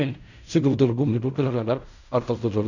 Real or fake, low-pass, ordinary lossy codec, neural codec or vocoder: fake; 7.2 kHz; none; codec, 16 kHz in and 24 kHz out, 0.4 kbps, LongCat-Audio-Codec, fine tuned four codebook decoder